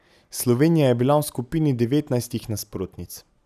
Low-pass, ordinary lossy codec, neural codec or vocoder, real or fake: 14.4 kHz; none; none; real